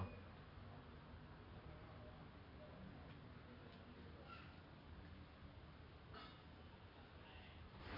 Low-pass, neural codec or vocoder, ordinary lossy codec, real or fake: 5.4 kHz; none; none; real